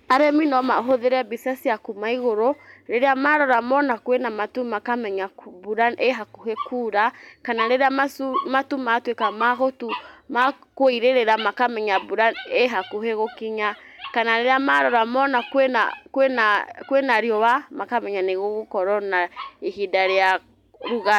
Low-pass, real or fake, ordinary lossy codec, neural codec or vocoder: 19.8 kHz; real; none; none